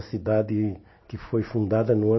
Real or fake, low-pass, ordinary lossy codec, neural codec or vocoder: real; 7.2 kHz; MP3, 24 kbps; none